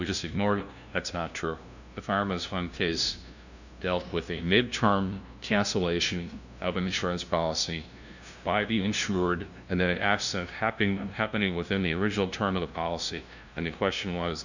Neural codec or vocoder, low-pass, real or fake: codec, 16 kHz, 0.5 kbps, FunCodec, trained on LibriTTS, 25 frames a second; 7.2 kHz; fake